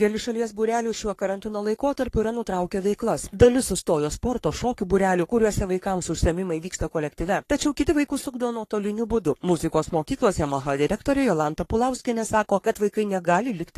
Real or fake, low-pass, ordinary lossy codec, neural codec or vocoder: fake; 14.4 kHz; AAC, 48 kbps; codec, 44.1 kHz, 3.4 kbps, Pupu-Codec